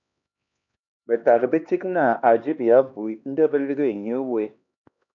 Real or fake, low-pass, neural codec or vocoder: fake; 7.2 kHz; codec, 16 kHz, 2 kbps, X-Codec, HuBERT features, trained on LibriSpeech